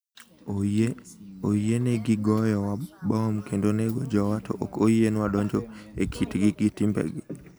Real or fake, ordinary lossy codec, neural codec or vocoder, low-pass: real; none; none; none